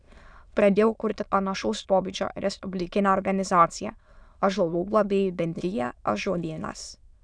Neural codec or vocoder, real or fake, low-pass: autoencoder, 22.05 kHz, a latent of 192 numbers a frame, VITS, trained on many speakers; fake; 9.9 kHz